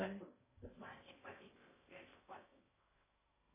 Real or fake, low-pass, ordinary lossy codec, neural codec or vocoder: fake; 3.6 kHz; MP3, 16 kbps; codec, 16 kHz in and 24 kHz out, 0.6 kbps, FocalCodec, streaming, 4096 codes